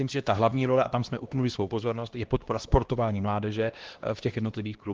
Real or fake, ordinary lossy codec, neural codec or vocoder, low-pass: fake; Opus, 16 kbps; codec, 16 kHz, 1 kbps, X-Codec, HuBERT features, trained on LibriSpeech; 7.2 kHz